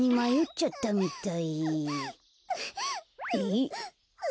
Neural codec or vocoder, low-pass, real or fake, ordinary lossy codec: none; none; real; none